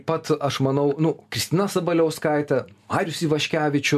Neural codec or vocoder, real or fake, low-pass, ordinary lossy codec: none; real; 14.4 kHz; MP3, 96 kbps